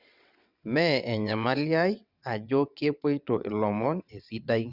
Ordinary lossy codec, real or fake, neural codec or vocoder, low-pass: Opus, 64 kbps; fake; vocoder, 22.05 kHz, 80 mel bands, WaveNeXt; 5.4 kHz